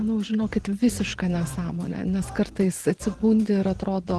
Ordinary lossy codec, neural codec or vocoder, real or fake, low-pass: Opus, 16 kbps; vocoder, 24 kHz, 100 mel bands, Vocos; fake; 10.8 kHz